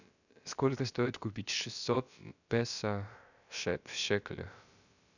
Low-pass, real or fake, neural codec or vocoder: 7.2 kHz; fake; codec, 16 kHz, about 1 kbps, DyCAST, with the encoder's durations